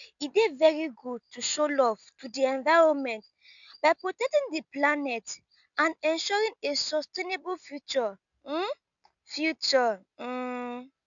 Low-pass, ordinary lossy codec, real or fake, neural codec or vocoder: 7.2 kHz; none; real; none